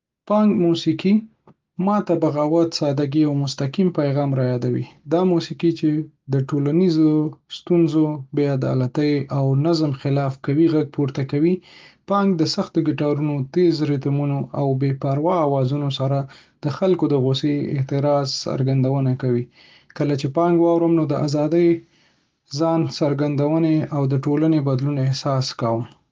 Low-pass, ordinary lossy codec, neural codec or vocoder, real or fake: 7.2 kHz; Opus, 32 kbps; none; real